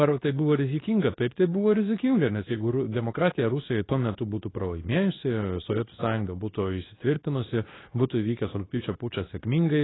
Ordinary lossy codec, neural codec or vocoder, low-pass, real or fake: AAC, 16 kbps; codec, 24 kHz, 0.9 kbps, WavTokenizer, medium speech release version 2; 7.2 kHz; fake